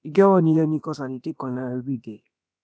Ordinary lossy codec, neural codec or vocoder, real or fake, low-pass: none; codec, 16 kHz, about 1 kbps, DyCAST, with the encoder's durations; fake; none